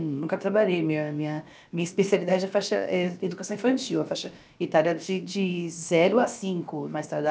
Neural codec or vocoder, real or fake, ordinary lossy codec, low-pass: codec, 16 kHz, about 1 kbps, DyCAST, with the encoder's durations; fake; none; none